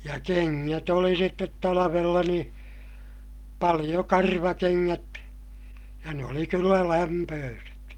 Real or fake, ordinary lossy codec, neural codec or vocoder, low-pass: real; Opus, 32 kbps; none; 19.8 kHz